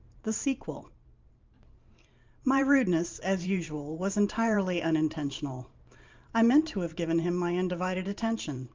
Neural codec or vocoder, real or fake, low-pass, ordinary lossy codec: vocoder, 44.1 kHz, 128 mel bands every 512 samples, BigVGAN v2; fake; 7.2 kHz; Opus, 24 kbps